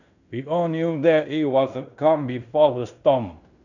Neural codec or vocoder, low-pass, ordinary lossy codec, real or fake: codec, 16 kHz in and 24 kHz out, 0.9 kbps, LongCat-Audio-Codec, fine tuned four codebook decoder; 7.2 kHz; none; fake